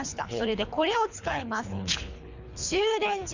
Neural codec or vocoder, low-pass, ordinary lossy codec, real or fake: codec, 24 kHz, 3 kbps, HILCodec; 7.2 kHz; Opus, 64 kbps; fake